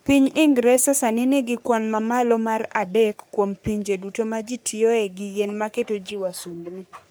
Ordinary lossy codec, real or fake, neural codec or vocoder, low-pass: none; fake; codec, 44.1 kHz, 3.4 kbps, Pupu-Codec; none